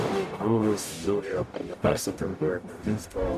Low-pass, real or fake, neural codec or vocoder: 14.4 kHz; fake; codec, 44.1 kHz, 0.9 kbps, DAC